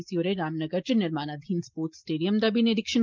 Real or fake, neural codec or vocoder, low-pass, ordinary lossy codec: real; none; 7.2 kHz; Opus, 32 kbps